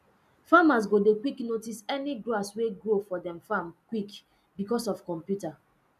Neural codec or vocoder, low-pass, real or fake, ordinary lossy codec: none; 14.4 kHz; real; none